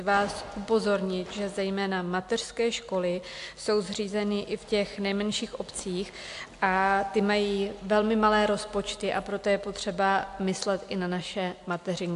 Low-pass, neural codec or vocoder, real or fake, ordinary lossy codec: 10.8 kHz; none; real; AAC, 64 kbps